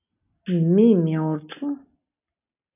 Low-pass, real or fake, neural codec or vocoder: 3.6 kHz; real; none